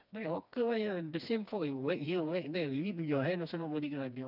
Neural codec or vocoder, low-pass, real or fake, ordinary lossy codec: codec, 16 kHz, 2 kbps, FreqCodec, smaller model; 5.4 kHz; fake; none